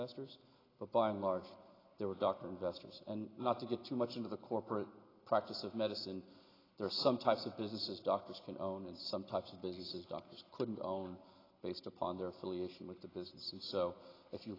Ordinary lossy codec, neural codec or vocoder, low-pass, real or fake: AAC, 24 kbps; none; 5.4 kHz; real